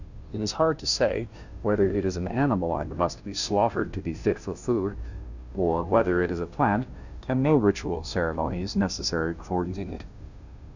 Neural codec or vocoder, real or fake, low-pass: codec, 16 kHz, 0.5 kbps, FunCodec, trained on Chinese and English, 25 frames a second; fake; 7.2 kHz